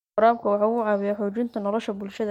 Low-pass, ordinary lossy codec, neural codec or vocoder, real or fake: 19.8 kHz; MP3, 64 kbps; none; real